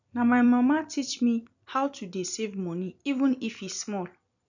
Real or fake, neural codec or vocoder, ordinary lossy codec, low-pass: fake; vocoder, 44.1 kHz, 128 mel bands every 256 samples, BigVGAN v2; none; 7.2 kHz